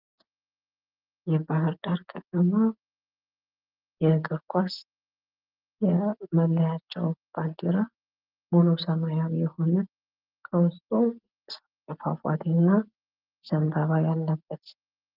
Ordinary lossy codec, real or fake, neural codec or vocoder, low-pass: Opus, 32 kbps; real; none; 5.4 kHz